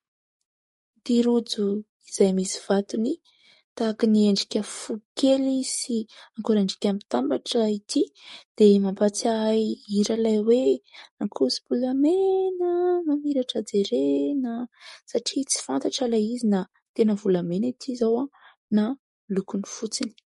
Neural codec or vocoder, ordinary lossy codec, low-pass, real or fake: codec, 44.1 kHz, 7.8 kbps, DAC; MP3, 48 kbps; 19.8 kHz; fake